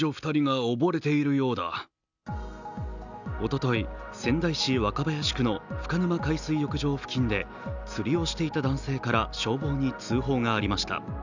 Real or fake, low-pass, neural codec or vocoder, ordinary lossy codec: real; 7.2 kHz; none; none